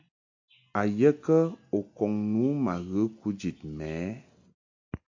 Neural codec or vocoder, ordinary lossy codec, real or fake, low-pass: none; AAC, 48 kbps; real; 7.2 kHz